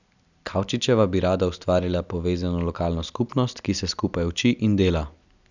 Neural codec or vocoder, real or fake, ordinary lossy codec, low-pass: none; real; none; 7.2 kHz